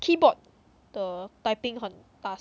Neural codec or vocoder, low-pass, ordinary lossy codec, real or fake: none; none; none; real